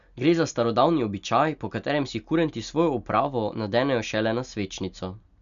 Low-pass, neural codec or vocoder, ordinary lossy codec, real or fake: 7.2 kHz; none; none; real